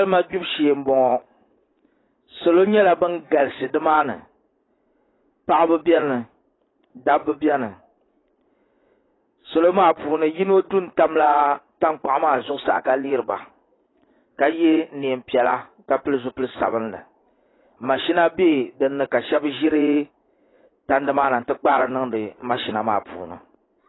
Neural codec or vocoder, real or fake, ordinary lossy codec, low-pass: vocoder, 44.1 kHz, 80 mel bands, Vocos; fake; AAC, 16 kbps; 7.2 kHz